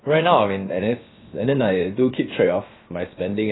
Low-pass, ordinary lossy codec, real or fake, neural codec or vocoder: 7.2 kHz; AAC, 16 kbps; fake; codec, 16 kHz, about 1 kbps, DyCAST, with the encoder's durations